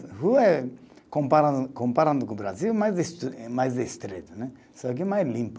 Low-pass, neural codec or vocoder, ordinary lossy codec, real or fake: none; none; none; real